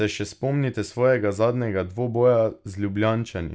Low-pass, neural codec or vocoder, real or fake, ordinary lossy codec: none; none; real; none